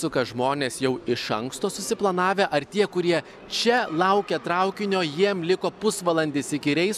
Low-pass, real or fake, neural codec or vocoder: 14.4 kHz; real; none